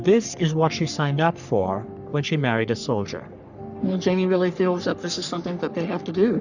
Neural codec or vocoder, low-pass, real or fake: codec, 44.1 kHz, 3.4 kbps, Pupu-Codec; 7.2 kHz; fake